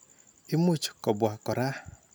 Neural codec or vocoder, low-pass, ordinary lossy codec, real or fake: none; none; none; real